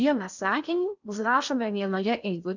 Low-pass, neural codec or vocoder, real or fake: 7.2 kHz; codec, 16 kHz in and 24 kHz out, 0.6 kbps, FocalCodec, streaming, 2048 codes; fake